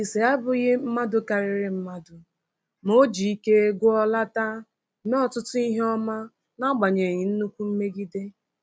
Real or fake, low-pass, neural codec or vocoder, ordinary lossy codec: real; none; none; none